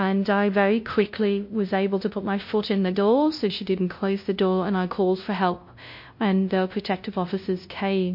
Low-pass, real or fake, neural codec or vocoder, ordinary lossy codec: 5.4 kHz; fake; codec, 16 kHz, 0.5 kbps, FunCodec, trained on LibriTTS, 25 frames a second; MP3, 32 kbps